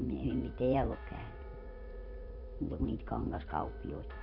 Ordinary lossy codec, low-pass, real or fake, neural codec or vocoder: none; 5.4 kHz; fake; vocoder, 44.1 kHz, 80 mel bands, Vocos